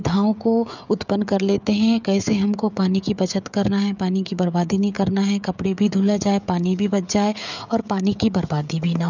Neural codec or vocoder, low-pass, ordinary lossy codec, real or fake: codec, 16 kHz, 16 kbps, FreqCodec, smaller model; 7.2 kHz; none; fake